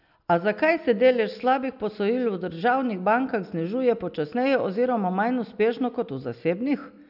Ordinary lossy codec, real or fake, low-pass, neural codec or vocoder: none; real; 5.4 kHz; none